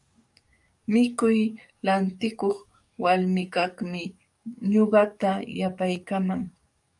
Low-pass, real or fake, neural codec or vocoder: 10.8 kHz; fake; codec, 44.1 kHz, 7.8 kbps, DAC